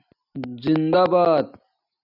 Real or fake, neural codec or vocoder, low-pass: real; none; 5.4 kHz